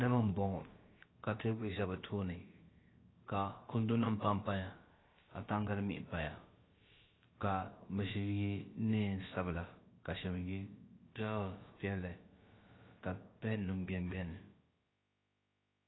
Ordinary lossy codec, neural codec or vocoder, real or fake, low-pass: AAC, 16 kbps; codec, 16 kHz, about 1 kbps, DyCAST, with the encoder's durations; fake; 7.2 kHz